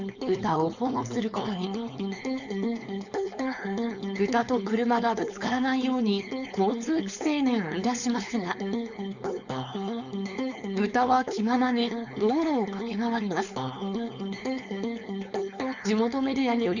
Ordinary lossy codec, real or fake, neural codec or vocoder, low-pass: none; fake; codec, 16 kHz, 4.8 kbps, FACodec; 7.2 kHz